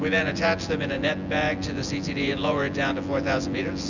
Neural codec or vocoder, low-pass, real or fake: vocoder, 24 kHz, 100 mel bands, Vocos; 7.2 kHz; fake